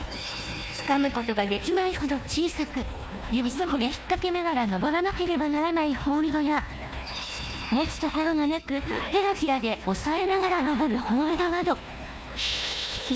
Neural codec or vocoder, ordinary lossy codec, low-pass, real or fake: codec, 16 kHz, 1 kbps, FunCodec, trained on Chinese and English, 50 frames a second; none; none; fake